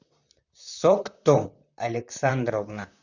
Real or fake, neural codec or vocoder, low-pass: fake; vocoder, 44.1 kHz, 128 mel bands, Pupu-Vocoder; 7.2 kHz